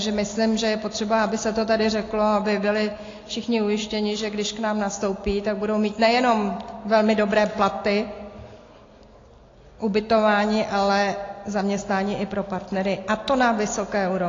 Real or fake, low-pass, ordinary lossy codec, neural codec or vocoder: real; 7.2 kHz; AAC, 32 kbps; none